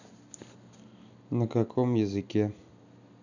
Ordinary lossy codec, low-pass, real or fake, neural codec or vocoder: none; 7.2 kHz; real; none